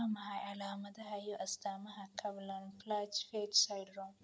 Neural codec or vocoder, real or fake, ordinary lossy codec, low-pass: none; real; none; none